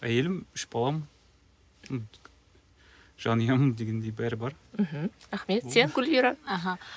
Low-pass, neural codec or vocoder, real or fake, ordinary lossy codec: none; none; real; none